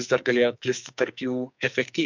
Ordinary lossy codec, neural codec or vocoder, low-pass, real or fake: MP3, 64 kbps; codec, 44.1 kHz, 2.6 kbps, SNAC; 7.2 kHz; fake